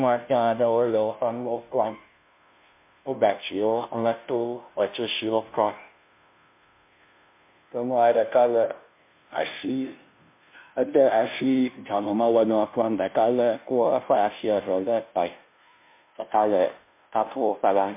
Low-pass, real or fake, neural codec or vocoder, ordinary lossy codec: 3.6 kHz; fake; codec, 16 kHz, 0.5 kbps, FunCodec, trained on Chinese and English, 25 frames a second; MP3, 32 kbps